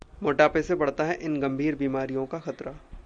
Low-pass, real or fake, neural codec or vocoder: 9.9 kHz; real; none